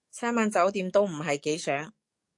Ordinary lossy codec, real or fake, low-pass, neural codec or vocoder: AAC, 64 kbps; fake; 10.8 kHz; codec, 44.1 kHz, 7.8 kbps, DAC